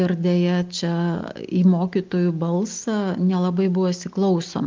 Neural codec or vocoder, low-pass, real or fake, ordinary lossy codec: none; 7.2 kHz; real; Opus, 32 kbps